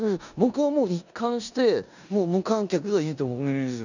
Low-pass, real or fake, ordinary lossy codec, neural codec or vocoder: 7.2 kHz; fake; none; codec, 16 kHz in and 24 kHz out, 0.9 kbps, LongCat-Audio-Codec, four codebook decoder